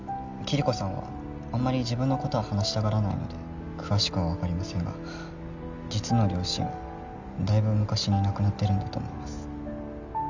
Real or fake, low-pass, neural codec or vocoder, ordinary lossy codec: real; 7.2 kHz; none; none